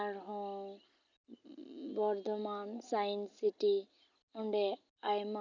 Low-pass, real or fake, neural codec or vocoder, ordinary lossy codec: 7.2 kHz; real; none; none